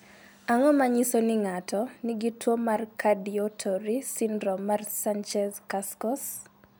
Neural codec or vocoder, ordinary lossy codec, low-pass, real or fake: none; none; none; real